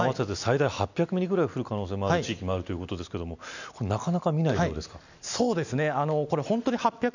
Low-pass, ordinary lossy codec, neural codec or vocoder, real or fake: 7.2 kHz; none; none; real